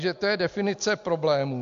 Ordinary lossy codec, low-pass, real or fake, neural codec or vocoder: AAC, 64 kbps; 7.2 kHz; real; none